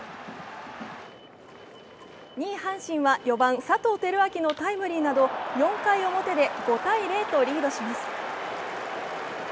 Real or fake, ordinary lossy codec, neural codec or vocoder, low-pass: real; none; none; none